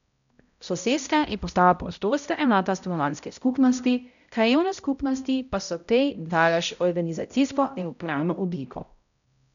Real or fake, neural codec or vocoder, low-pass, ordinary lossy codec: fake; codec, 16 kHz, 0.5 kbps, X-Codec, HuBERT features, trained on balanced general audio; 7.2 kHz; none